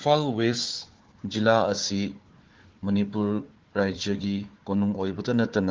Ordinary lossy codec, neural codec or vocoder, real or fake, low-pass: Opus, 16 kbps; codec, 16 kHz, 4 kbps, FunCodec, trained on Chinese and English, 50 frames a second; fake; 7.2 kHz